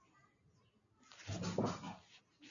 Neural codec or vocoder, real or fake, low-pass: none; real; 7.2 kHz